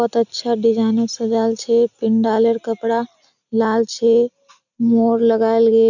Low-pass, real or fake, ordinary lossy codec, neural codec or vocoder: 7.2 kHz; real; none; none